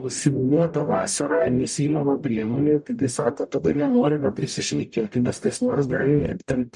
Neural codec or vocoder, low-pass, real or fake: codec, 44.1 kHz, 0.9 kbps, DAC; 10.8 kHz; fake